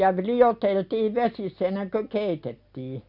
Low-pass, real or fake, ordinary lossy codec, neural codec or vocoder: 5.4 kHz; real; none; none